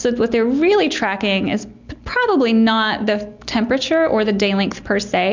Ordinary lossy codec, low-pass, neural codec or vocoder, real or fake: MP3, 64 kbps; 7.2 kHz; none; real